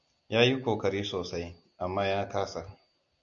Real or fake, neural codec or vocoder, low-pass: real; none; 7.2 kHz